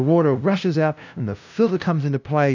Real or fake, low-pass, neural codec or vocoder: fake; 7.2 kHz; codec, 16 kHz, 0.5 kbps, FunCodec, trained on LibriTTS, 25 frames a second